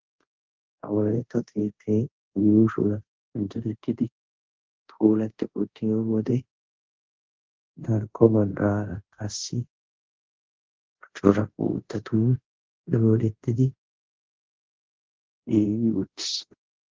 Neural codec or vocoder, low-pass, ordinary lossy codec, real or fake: codec, 24 kHz, 0.5 kbps, DualCodec; 7.2 kHz; Opus, 16 kbps; fake